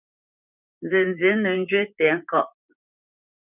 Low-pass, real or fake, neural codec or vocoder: 3.6 kHz; fake; vocoder, 44.1 kHz, 128 mel bands, Pupu-Vocoder